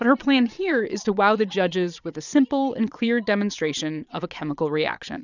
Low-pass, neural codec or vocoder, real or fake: 7.2 kHz; vocoder, 22.05 kHz, 80 mel bands, Vocos; fake